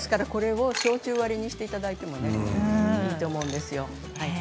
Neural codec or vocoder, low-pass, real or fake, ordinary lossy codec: none; none; real; none